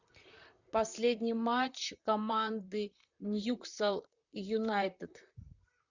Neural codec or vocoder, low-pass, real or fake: vocoder, 44.1 kHz, 128 mel bands, Pupu-Vocoder; 7.2 kHz; fake